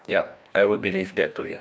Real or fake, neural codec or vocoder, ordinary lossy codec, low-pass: fake; codec, 16 kHz, 1 kbps, FreqCodec, larger model; none; none